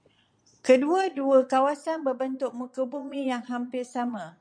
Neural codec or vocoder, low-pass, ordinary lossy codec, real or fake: vocoder, 22.05 kHz, 80 mel bands, Vocos; 9.9 kHz; MP3, 64 kbps; fake